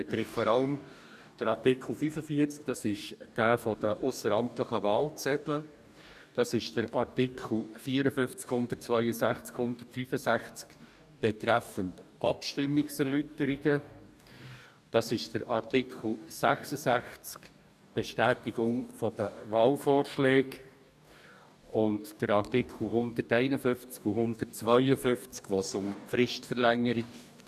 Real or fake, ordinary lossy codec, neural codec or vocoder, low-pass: fake; none; codec, 44.1 kHz, 2.6 kbps, DAC; 14.4 kHz